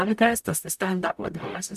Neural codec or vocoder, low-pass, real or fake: codec, 44.1 kHz, 0.9 kbps, DAC; 14.4 kHz; fake